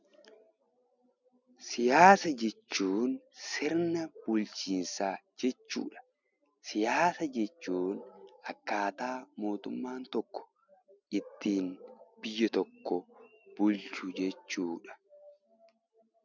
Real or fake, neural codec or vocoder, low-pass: real; none; 7.2 kHz